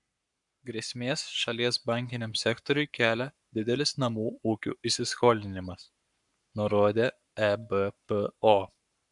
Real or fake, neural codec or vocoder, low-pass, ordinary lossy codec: fake; codec, 44.1 kHz, 7.8 kbps, Pupu-Codec; 10.8 kHz; MP3, 96 kbps